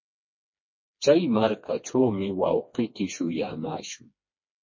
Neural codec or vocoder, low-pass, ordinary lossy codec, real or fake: codec, 16 kHz, 2 kbps, FreqCodec, smaller model; 7.2 kHz; MP3, 32 kbps; fake